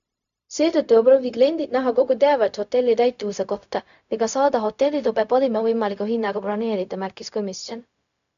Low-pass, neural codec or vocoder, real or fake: 7.2 kHz; codec, 16 kHz, 0.4 kbps, LongCat-Audio-Codec; fake